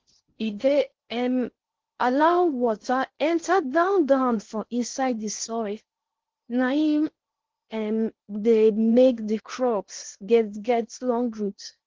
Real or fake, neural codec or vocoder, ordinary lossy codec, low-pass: fake; codec, 16 kHz in and 24 kHz out, 0.6 kbps, FocalCodec, streaming, 4096 codes; Opus, 16 kbps; 7.2 kHz